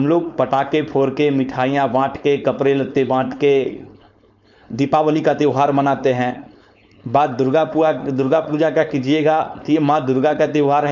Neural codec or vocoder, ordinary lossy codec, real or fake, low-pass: codec, 16 kHz, 4.8 kbps, FACodec; none; fake; 7.2 kHz